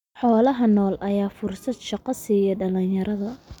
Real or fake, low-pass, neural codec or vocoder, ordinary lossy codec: real; 19.8 kHz; none; none